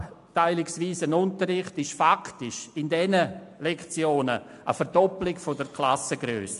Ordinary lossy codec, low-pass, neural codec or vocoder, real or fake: AAC, 64 kbps; 10.8 kHz; none; real